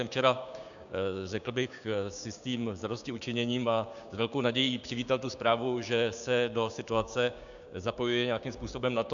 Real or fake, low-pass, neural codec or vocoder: fake; 7.2 kHz; codec, 16 kHz, 6 kbps, DAC